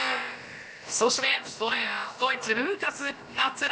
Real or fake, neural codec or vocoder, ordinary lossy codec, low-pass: fake; codec, 16 kHz, about 1 kbps, DyCAST, with the encoder's durations; none; none